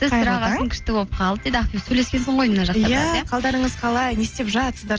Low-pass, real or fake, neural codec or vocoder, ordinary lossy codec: 7.2 kHz; real; none; Opus, 24 kbps